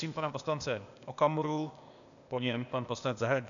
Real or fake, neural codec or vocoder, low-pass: fake; codec, 16 kHz, 0.8 kbps, ZipCodec; 7.2 kHz